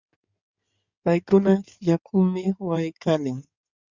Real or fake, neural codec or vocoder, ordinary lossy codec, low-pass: fake; codec, 16 kHz in and 24 kHz out, 2.2 kbps, FireRedTTS-2 codec; Opus, 64 kbps; 7.2 kHz